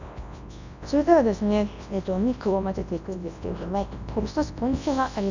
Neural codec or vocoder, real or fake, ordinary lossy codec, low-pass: codec, 24 kHz, 0.9 kbps, WavTokenizer, large speech release; fake; none; 7.2 kHz